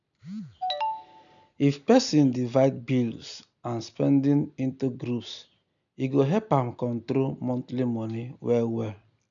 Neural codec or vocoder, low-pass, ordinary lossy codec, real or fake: none; 7.2 kHz; none; real